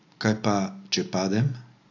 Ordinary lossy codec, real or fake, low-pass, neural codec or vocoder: AAC, 48 kbps; real; 7.2 kHz; none